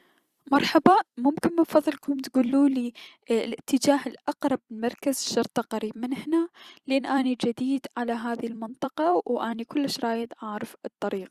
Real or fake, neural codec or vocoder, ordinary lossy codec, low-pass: fake; vocoder, 44.1 kHz, 128 mel bands every 256 samples, BigVGAN v2; Opus, 64 kbps; 14.4 kHz